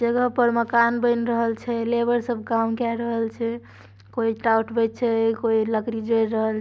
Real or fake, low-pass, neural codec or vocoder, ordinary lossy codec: real; none; none; none